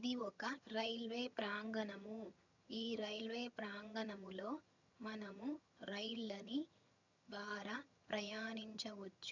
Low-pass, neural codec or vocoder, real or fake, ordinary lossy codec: 7.2 kHz; vocoder, 22.05 kHz, 80 mel bands, HiFi-GAN; fake; none